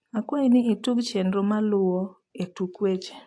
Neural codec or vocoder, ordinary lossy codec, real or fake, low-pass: none; AAC, 48 kbps; real; 9.9 kHz